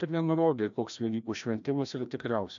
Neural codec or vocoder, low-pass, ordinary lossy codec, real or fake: codec, 16 kHz, 1 kbps, FreqCodec, larger model; 7.2 kHz; AAC, 64 kbps; fake